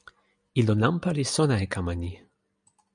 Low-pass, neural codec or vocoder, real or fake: 9.9 kHz; none; real